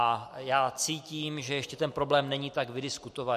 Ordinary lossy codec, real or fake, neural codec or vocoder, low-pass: MP3, 64 kbps; real; none; 14.4 kHz